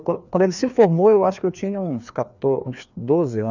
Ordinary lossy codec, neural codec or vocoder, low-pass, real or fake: none; codec, 16 kHz, 2 kbps, FreqCodec, larger model; 7.2 kHz; fake